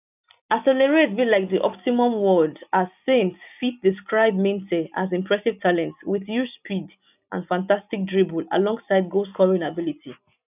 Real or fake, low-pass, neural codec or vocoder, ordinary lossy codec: real; 3.6 kHz; none; none